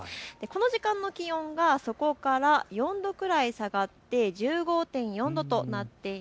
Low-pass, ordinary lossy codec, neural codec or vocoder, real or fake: none; none; none; real